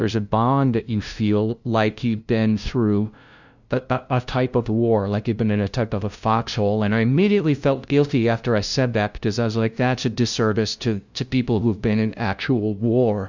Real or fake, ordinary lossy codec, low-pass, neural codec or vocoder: fake; Opus, 64 kbps; 7.2 kHz; codec, 16 kHz, 0.5 kbps, FunCodec, trained on LibriTTS, 25 frames a second